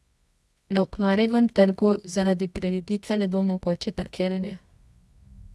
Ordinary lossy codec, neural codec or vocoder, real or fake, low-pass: none; codec, 24 kHz, 0.9 kbps, WavTokenizer, medium music audio release; fake; none